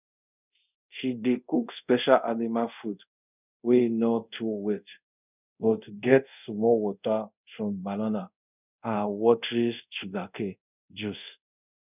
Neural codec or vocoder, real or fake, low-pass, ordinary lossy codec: codec, 24 kHz, 0.5 kbps, DualCodec; fake; 3.6 kHz; none